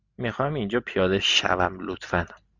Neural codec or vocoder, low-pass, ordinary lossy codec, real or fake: none; 7.2 kHz; Opus, 64 kbps; real